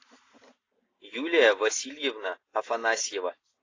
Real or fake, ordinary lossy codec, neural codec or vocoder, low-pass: real; AAC, 32 kbps; none; 7.2 kHz